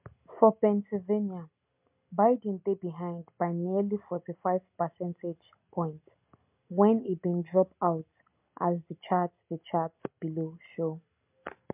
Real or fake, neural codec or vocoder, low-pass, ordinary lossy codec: real; none; 3.6 kHz; MP3, 32 kbps